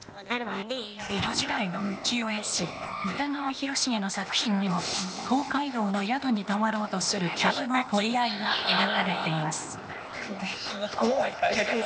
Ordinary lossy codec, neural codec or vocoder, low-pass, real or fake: none; codec, 16 kHz, 0.8 kbps, ZipCodec; none; fake